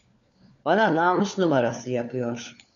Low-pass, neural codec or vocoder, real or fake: 7.2 kHz; codec, 16 kHz, 4 kbps, FunCodec, trained on LibriTTS, 50 frames a second; fake